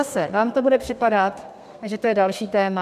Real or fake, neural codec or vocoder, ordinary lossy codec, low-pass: fake; codec, 32 kHz, 1.9 kbps, SNAC; MP3, 96 kbps; 14.4 kHz